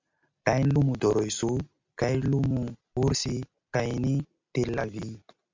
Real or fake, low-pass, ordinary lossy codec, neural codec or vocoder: real; 7.2 kHz; MP3, 64 kbps; none